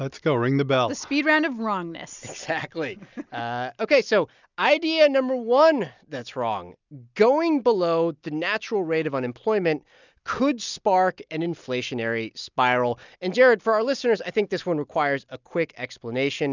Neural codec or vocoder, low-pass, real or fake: none; 7.2 kHz; real